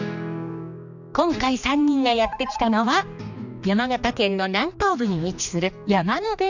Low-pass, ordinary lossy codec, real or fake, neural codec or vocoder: 7.2 kHz; none; fake; codec, 16 kHz, 2 kbps, X-Codec, HuBERT features, trained on general audio